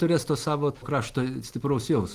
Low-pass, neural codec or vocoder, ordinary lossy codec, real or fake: 14.4 kHz; none; Opus, 24 kbps; real